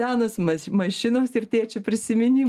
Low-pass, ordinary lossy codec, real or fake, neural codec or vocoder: 14.4 kHz; Opus, 32 kbps; real; none